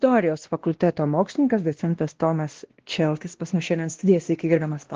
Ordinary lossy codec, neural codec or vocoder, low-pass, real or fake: Opus, 16 kbps; codec, 16 kHz, 1 kbps, X-Codec, WavLM features, trained on Multilingual LibriSpeech; 7.2 kHz; fake